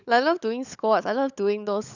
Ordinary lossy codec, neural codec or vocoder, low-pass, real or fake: none; codec, 16 kHz, 16 kbps, FunCodec, trained on LibriTTS, 50 frames a second; 7.2 kHz; fake